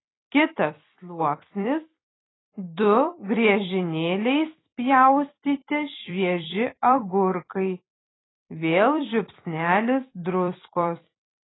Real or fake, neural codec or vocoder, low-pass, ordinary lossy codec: fake; vocoder, 44.1 kHz, 128 mel bands every 256 samples, BigVGAN v2; 7.2 kHz; AAC, 16 kbps